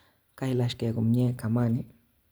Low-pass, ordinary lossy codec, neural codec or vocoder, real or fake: none; none; vocoder, 44.1 kHz, 128 mel bands every 256 samples, BigVGAN v2; fake